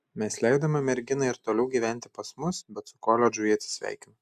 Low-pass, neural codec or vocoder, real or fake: 14.4 kHz; none; real